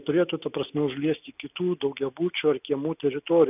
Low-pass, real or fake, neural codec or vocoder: 3.6 kHz; real; none